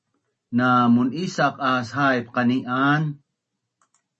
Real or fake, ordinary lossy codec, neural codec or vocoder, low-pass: real; MP3, 32 kbps; none; 10.8 kHz